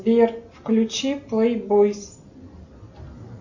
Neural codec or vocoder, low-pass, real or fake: none; 7.2 kHz; real